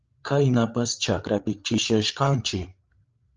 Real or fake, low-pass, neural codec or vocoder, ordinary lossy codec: fake; 7.2 kHz; codec, 16 kHz, 8 kbps, FreqCodec, larger model; Opus, 24 kbps